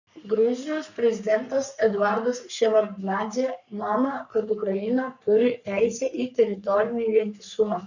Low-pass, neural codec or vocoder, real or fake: 7.2 kHz; codec, 44.1 kHz, 3.4 kbps, Pupu-Codec; fake